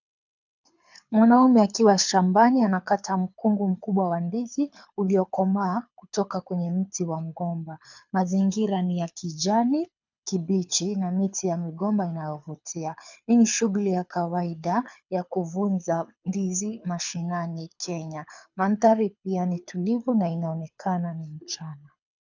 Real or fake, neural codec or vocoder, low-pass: fake; codec, 24 kHz, 6 kbps, HILCodec; 7.2 kHz